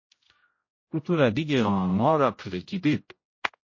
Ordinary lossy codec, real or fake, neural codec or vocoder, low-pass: MP3, 32 kbps; fake; codec, 16 kHz, 0.5 kbps, X-Codec, HuBERT features, trained on general audio; 7.2 kHz